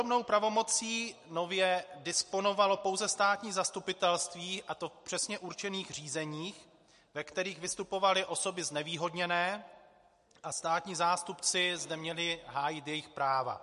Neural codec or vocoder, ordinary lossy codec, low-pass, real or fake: none; MP3, 48 kbps; 14.4 kHz; real